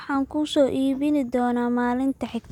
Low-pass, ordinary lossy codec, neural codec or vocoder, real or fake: 19.8 kHz; none; none; real